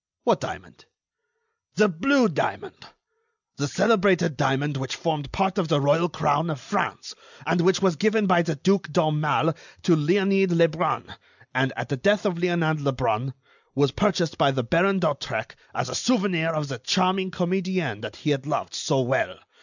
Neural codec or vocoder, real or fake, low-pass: none; real; 7.2 kHz